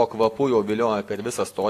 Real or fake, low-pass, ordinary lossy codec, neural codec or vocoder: real; 14.4 kHz; AAC, 64 kbps; none